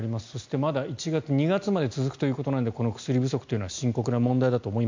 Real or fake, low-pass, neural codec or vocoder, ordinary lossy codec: real; 7.2 kHz; none; MP3, 64 kbps